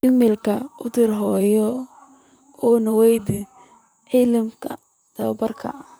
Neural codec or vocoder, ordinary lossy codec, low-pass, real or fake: vocoder, 44.1 kHz, 128 mel bands, Pupu-Vocoder; none; none; fake